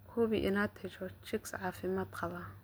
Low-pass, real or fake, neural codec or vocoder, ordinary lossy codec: none; real; none; none